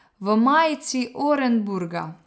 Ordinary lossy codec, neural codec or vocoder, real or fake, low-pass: none; none; real; none